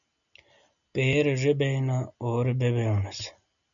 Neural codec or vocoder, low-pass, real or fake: none; 7.2 kHz; real